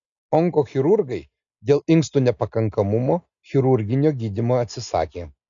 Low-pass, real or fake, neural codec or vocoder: 7.2 kHz; real; none